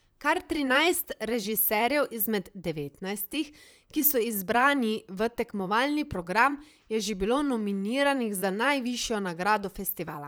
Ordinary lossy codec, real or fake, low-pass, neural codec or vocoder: none; fake; none; vocoder, 44.1 kHz, 128 mel bands, Pupu-Vocoder